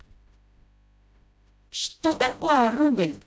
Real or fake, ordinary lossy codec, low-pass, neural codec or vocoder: fake; none; none; codec, 16 kHz, 0.5 kbps, FreqCodec, smaller model